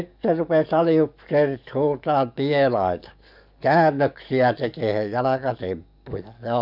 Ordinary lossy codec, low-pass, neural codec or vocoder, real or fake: none; 5.4 kHz; codec, 44.1 kHz, 7.8 kbps, DAC; fake